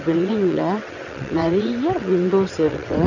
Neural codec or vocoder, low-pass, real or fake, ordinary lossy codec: vocoder, 22.05 kHz, 80 mel bands, WaveNeXt; 7.2 kHz; fake; none